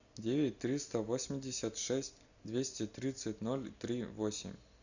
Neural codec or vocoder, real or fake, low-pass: none; real; 7.2 kHz